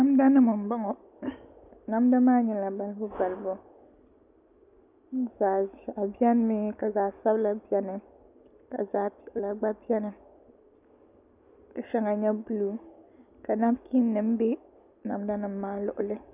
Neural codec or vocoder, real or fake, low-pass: none; real; 3.6 kHz